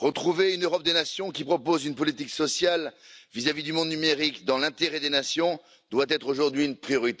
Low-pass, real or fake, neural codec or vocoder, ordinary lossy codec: none; real; none; none